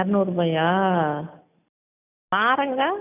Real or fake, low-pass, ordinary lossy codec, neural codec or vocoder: real; 3.6 kHz; none; none